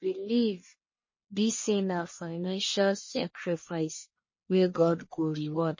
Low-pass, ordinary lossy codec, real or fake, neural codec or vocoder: 7.2 kHz; MP3, 32 kbps; fake; codec, 44.1 kHz, 1.7 kbps, Pupu-Codec